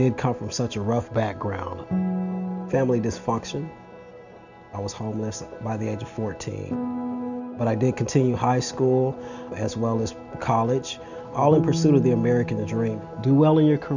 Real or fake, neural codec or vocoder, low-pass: real; none; 7.2 kHz